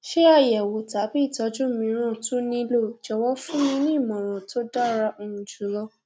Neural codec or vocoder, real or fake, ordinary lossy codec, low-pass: none; real; none; none